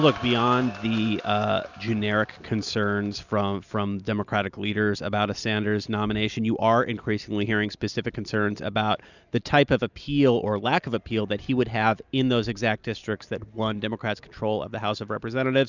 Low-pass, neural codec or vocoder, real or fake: 7.2 kHz; none; real